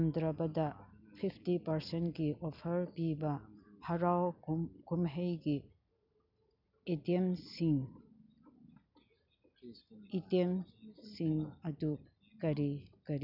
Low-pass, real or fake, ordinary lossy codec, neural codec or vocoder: 5.4 kHz; real; none; none